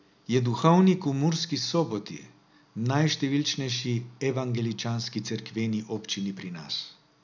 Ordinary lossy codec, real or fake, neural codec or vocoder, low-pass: none; real; none; 7.2 kHz